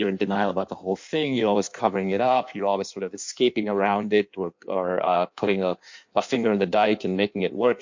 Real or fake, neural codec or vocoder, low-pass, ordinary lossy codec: fake; codec, 16 kHz in and 24 kHz out, 1.1 kbps, FireRedTTS-2 codec; 7.2 kHz; MP3, 48 kbps